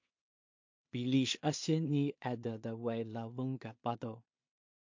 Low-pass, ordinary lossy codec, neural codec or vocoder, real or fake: 7.2 kHz; MP3, 48 kbps; codec, 16 kHz in and 24 kHz out, 0.4 kbps, LongCat-Audio-Codec, two codebook decoder; fake